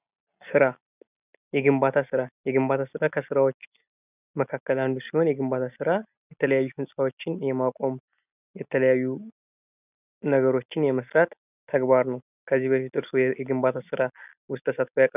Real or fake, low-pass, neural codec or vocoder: real; 3.6 kHz; none